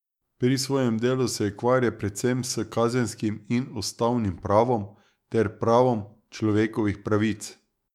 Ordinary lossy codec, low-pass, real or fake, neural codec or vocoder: MP3, 96 kbps; 19.8 kHz; fake; autoencoder, 48 kHz, 128 numbers a frame, DAC-VAE, trained on Japanese speech